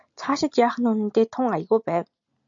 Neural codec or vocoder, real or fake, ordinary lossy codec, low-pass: none; real; AAC, 64 kbps; 7.2 kHz